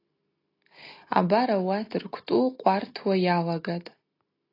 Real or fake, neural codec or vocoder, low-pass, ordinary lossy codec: real; none; 5.4 kHz; AAC, 32 kbps